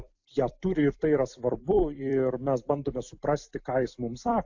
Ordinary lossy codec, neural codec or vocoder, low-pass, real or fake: AAC, 48 kbps; vocoder, 44.1 kHz, 128 mel bands every 256 samples, BigVGAN v2; 7.2 kHz; fake